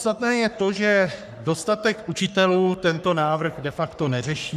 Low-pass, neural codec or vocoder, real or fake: 14.4 kHz; codec, 44.1 kHz, 3.4 kbps, Pupu-Codec; fake